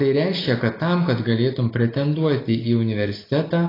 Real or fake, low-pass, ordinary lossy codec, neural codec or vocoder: real; 5.4 kHz; AAC, 24 kbps; none